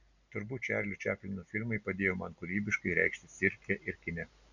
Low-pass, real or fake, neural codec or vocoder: 7.2 kHz; real; none